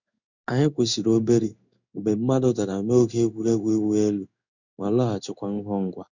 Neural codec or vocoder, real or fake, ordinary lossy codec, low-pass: codec, 16 kHz in and 24 kHz out, 1 kbps, XY-Tokenizer; fake; none; 7.2 kHz